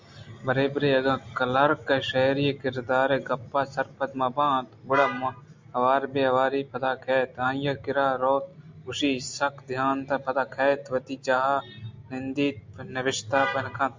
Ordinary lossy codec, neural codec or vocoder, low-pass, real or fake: MP3, 64 kbps; none; 7.2 kHz; real